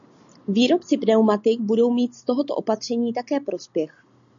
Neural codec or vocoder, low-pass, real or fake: none; 7.2 kHz; real